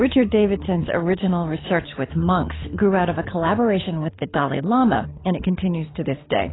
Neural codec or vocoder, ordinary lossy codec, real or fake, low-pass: codec, 24 kHz, 6 kbps, HILCodec; AAC, 16 kbps; fake; 7.2 kHz